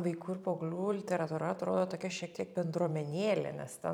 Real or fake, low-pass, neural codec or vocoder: fake; 19.8 kHz; vocoder, 44.1 kHz, 128 mel bands every 512 samples, BigVGAN v2